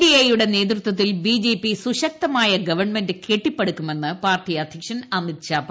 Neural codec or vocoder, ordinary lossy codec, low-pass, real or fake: none; none; none; real